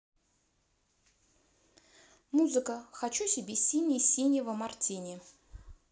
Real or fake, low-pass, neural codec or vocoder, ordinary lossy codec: real; none; none; none